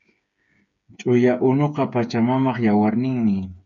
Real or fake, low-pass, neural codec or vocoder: fake; 7.2 kHz; codec, 16 kHz, 8 kbps, FreqCodec, smaller model